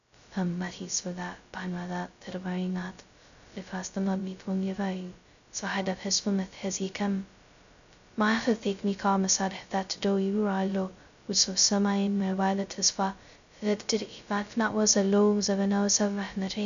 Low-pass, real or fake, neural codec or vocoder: 7.2 kHz; fake; codec, 16 kHz, 0.2 kbps, FocalCodec